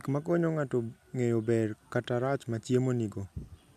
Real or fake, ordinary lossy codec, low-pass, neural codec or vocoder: real; none; 14.4 kHz; none